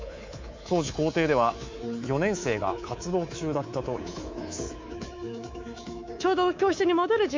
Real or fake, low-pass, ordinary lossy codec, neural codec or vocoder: fake; 7.2 kHz; MP3, 64 kbps; codec, 24 kHz, 3.1 kbps, DualCodec